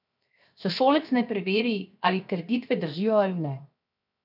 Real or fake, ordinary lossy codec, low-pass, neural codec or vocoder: fake; none; 5.4 kHz; codec, 16 kHz, 0.7 kbps, FocalCodec